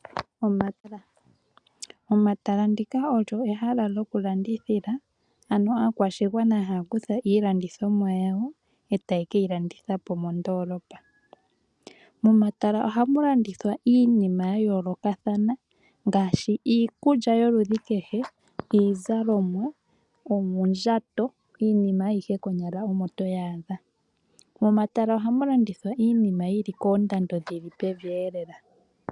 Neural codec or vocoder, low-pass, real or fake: none; 10.8 kHz; real